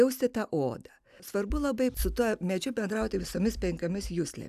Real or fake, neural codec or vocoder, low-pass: real; none; 14.4 kHz